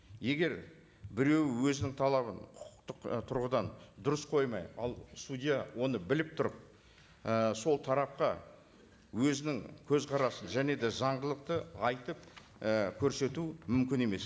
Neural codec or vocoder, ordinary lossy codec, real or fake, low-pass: none; none; real; none